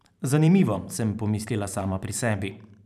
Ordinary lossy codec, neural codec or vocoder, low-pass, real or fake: none; vocoder, 44.1 kHz, 128 mel bands every 512 samples, BigVGAN v2; 14.4 kHz; fake